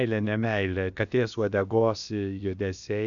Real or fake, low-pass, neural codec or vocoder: fake; 7.2 kHz; codec, 16 kHz, about 1 kbps, DyCAST, with the encoder's durations